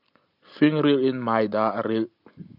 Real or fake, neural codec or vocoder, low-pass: real; none; 5.4 kHz